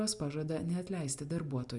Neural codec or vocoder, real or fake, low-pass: none; real; 10.8 kHz